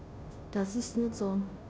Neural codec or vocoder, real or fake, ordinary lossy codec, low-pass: codec, 16 kHz, 0.5 kbps, FunCodec, trained on Chinese and English, 25 frames a second; fake; none; none